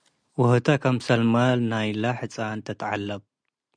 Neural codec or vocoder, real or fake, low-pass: none; real; 9.9 kHz